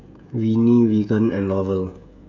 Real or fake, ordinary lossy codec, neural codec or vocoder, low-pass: fake; none; codec, 16 kHz, 16 kbps, FreqCodec, smaller model; 7.2 kHz